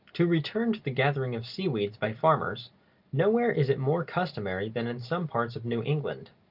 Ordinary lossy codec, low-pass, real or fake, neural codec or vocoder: Opus, 24 kbps; 5.4 kHz; real; none